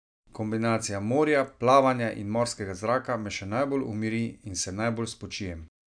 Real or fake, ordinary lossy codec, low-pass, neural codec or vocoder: real; none; 9.9 kHz; none